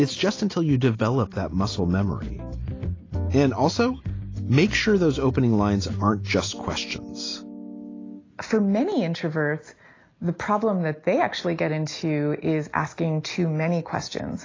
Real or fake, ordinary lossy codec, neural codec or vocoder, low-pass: real; AAC, 32 kbps; none; 7.2 kHz